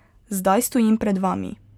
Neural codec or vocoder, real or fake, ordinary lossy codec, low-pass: none; real; none; 19.8 kHz